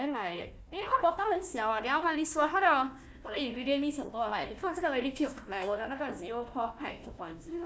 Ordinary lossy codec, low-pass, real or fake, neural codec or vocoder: none; none; fake; codec, 16 kHz, 1 kbps, FunCodec, trained on Chinese and English, 50 frames a second